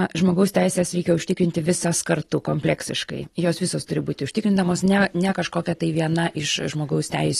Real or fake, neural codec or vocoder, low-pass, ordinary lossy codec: real; none; 19.8 kHz; AAC, 32 kbps